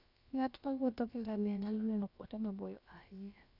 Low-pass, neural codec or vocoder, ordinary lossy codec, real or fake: 5.4 kHz; codec, 16 kHz, about 1 kbps, DyCAST, with the encoder's durations; none; fake